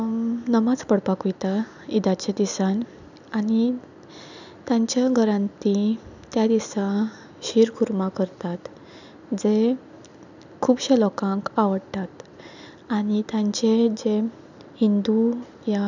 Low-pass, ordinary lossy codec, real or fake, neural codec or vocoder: 7.2 kHz; none; real; none